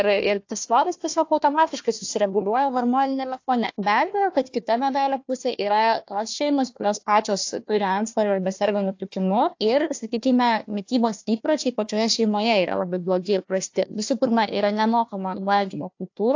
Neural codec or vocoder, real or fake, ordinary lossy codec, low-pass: codec, 16 kHz, 1 kbps, FunCodec, trained on Chinese and English, 50 frames a second; fake; AAC, 48 kbps; 7.2 kHz